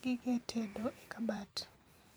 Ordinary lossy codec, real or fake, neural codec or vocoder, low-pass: none; fake; vocoder, 44.1 kHz, 128 mel bands every 512 samples, BigVGAN v2; none